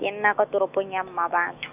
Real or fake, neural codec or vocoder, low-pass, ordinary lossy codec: fake; autoencoder, 48 kHz, 128 numbers a frame, DAC-VAE, trained on Japanese speech; 3.6 kHz; none